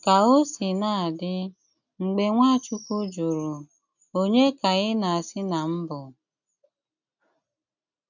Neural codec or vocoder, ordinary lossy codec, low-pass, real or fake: none; none; 7.2 kHz; real